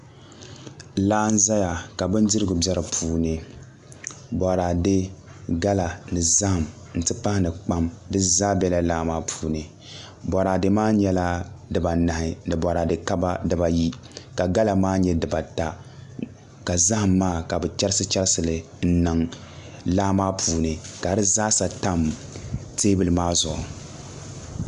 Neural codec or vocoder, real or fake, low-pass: none; real; 10.8 kHz